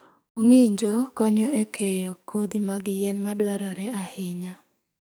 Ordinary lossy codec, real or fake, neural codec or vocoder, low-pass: none; fake; codec, 44.1 kHz, 2.6 kbps, SNAC; none